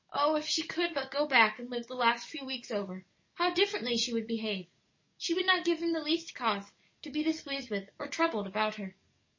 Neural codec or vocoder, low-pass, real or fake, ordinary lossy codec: codec, 44.1 kHz, 7.8 kbps, DAC; 7.2 kHz; fake; MP3, 32 kbps